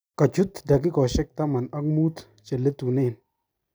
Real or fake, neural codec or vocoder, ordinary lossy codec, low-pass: real; none; none; none